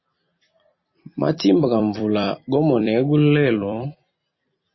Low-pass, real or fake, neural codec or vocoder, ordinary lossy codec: 7.2 kHz; fake; vocoder, 44.1 kHz, 128 mel bands every 256 samples, BigVGAN v2; MP3, 24 kbps